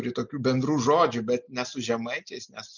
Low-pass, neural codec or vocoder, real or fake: 7.2 kHz; none; real